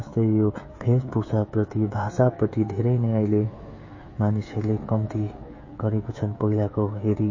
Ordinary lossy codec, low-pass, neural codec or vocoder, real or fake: MP3, 32 kbps; 7.2 kHz; codec, 16 kHz, 16 kbps, FreqCodec, smaller model; fake